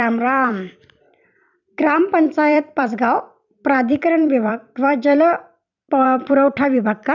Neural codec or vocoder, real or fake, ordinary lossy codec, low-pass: vocoder, 44.1 kHz, 128 mel bands every 256 samples, BigVGAN v2; fake; none; 7.2 kHz